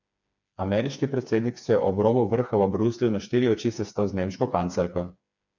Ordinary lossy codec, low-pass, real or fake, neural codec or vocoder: none; 7.2 kHz; fake; codec, 16 kHz, 4 kbps, FreqCodec, smaller model